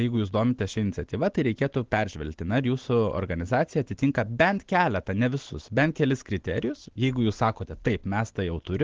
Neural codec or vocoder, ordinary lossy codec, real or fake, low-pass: none; Opus, 16 kbps; real; 7.2 kHz